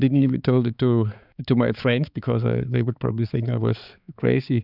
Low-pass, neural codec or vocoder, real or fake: 5.4 kHz; codec, 16 kHz, 4 kbps, X-Codec, HuBERT features, trained on balanced general audio; fake